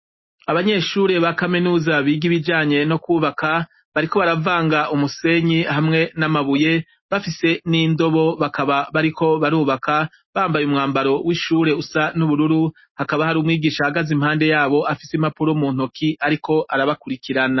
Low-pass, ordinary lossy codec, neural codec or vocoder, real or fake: 7.2 kHz; MP3, 24 kbps; none; real